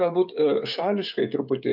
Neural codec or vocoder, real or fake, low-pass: codec, 16 kHz, 16 kbps, FreqCodec, smaller model; fake; 5.4 kHz